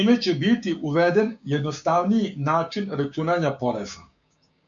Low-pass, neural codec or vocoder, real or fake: 7.2 kHz; codec, 16 kHz, 6 kbps, DAC; fake